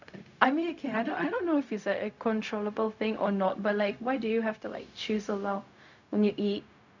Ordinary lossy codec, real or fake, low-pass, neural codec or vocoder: none; fake; 7.2 kHz; codec, 16 kHz, 0.4 kbps, LongCat-Audio-Codec